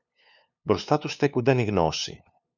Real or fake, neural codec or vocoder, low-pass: fake; codec, 16 kHz, 2 kbps, FunCodec, trained on LibriTTS, 25 frames a second; 7.2 kHz